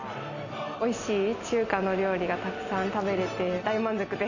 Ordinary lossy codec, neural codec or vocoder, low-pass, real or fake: none; none; 7.2 kHz; real